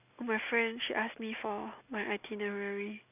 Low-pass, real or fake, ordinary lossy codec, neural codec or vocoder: 3.6 kHz; real; none; none